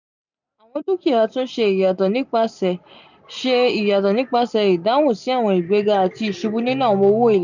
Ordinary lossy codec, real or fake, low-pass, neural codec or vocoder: none; real; 7.2 kHz; none